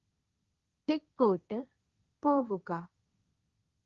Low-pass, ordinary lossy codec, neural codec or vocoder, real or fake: 7.2 kHz; Opus, 32 kbps; codec, 16 kHz, 1.1 kbps, Voila-Tokenizer; fake